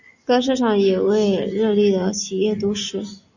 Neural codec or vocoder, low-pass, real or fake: none; 7.2 kHz; real